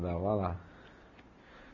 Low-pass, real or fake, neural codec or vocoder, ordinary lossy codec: 7.2 kHz; real; none; none